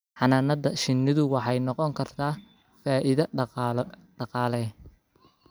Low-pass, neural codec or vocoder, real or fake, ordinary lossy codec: none; none; real; none